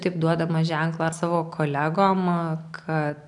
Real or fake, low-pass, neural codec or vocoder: real; 10.8 kHz; none